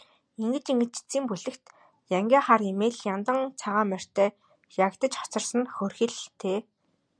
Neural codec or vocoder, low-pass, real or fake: none; 9.9 kHz; real